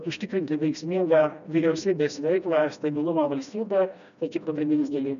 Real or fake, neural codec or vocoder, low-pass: fake; codec, 16 kHz, 1 kbps, FreqCodec, smaller model; 7.2 kHz